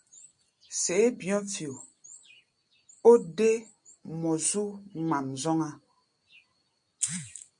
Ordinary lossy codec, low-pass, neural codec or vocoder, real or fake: MP3, 48 kbps; 9.9 kHz; none; real